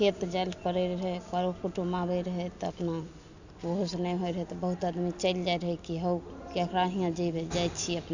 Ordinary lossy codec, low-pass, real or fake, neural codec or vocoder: none; 7.2 kHz; real; none